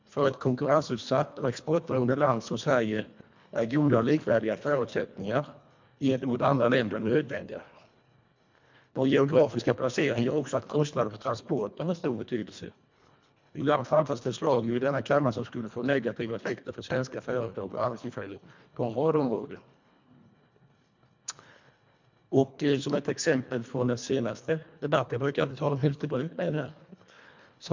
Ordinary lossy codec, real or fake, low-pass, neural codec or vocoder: MP3, 64 kbps; fake; 7.2 kHz; codec, 24 kHz, 1.5 kbps, HILCodec